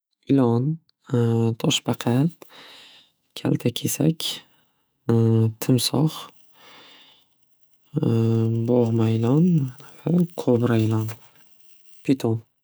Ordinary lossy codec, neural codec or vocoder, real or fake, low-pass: none; autoencoder, 48 kHz, 128 numbers a frame, DAC-VAE, trained on Japanese speech; fake; none